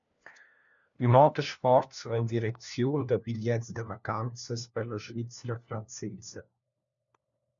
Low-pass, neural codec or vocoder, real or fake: 7.2 kHz; codec, 16 kHz, 1 kbps, FunCodec, trained on LibriTTS, 50 frames a second; fake